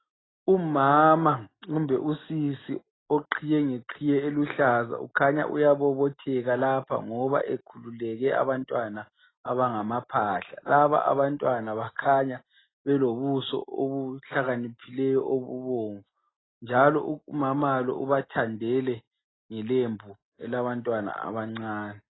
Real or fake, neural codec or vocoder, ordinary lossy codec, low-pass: real; none; AAC, 16 kbps; 7.2 kHz